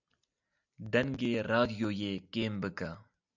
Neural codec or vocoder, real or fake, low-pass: none; real; 7.2 kHz